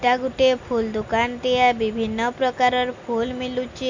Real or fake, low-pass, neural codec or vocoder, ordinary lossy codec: real; 7.2 kHz; none; MP3, 48 kbps